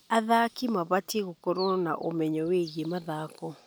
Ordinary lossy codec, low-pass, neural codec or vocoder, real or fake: none; none; none; real